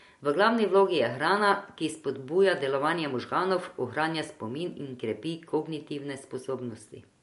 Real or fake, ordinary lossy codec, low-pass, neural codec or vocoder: real; MP3, 48 kbps; 14.4 kHz; none